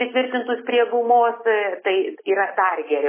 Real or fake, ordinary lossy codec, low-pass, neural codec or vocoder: real; MP3, 16 kbps; 3.6 kHz; none